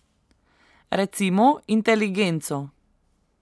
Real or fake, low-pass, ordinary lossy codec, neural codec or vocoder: real; none; none; none